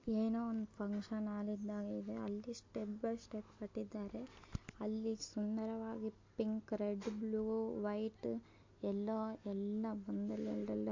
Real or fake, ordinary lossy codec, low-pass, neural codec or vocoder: real; MP3, 48 kbps; 7.2 kHz; none